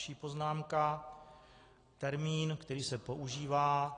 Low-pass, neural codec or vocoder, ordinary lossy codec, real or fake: 9.9 kHz; none; AAC, 32 kbps; real